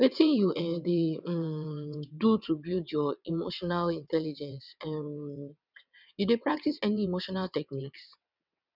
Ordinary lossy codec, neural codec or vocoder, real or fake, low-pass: none; vocoder, 22.05 kHz, 80 mel bands, WaveNeXt; fake; 5.4 kHz